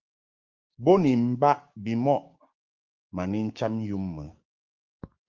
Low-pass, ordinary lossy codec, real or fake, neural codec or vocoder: 7.2 kHz; Opus, 24 kbps; fake; codec, 16 kHz, 6 kbps, DAC